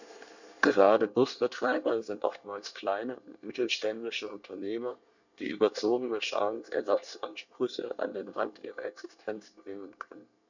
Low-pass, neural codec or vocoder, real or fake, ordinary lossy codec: 7.2 kHz; codec, 24 kHz, 1 kbps, SNAC; fake; none